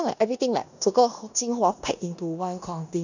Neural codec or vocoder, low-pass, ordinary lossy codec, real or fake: codec, 16 kHz in and 24 kHz out, 0.9 kbps, LongCat-Audio-Codec, four codebook decoder; 7.2 kHz; none; fake